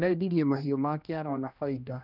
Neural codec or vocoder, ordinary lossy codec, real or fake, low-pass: codec, 16 kHz, 1 kbps, X-Codec, HuBERT features, trained on general audio; none; fake; 5.4 kHz